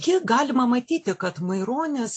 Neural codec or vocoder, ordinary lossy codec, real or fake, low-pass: none; AAC, 48 kbps; real; 9.9 kHz